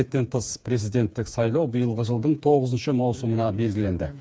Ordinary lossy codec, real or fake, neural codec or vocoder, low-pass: none; fake; codec, 16 kHz, 4 kbps, FreqCodec, smaller model; none